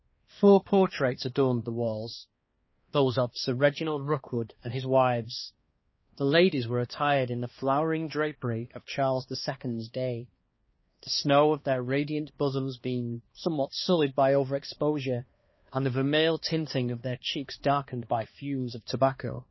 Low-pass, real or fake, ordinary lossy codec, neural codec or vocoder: 7.2 kHz; fake; MP3, 24 kbps; codec, 16 kHz, 2 kbps, X-Codec, HuBERT features, trained on balanced general audio